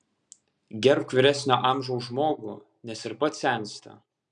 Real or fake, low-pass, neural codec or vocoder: real; 9.9 kHz; none